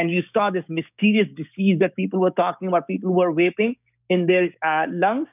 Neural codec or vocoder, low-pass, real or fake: codec, 16 kHz, 6 kbps, DAC; 3.6 kHz; fake